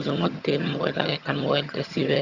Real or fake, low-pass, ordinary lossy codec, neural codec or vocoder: fake; 7.2 kHz; Opus, 64 kbps; vocoder, 22.05 kHz, 80 mel bands, HiFi-GAN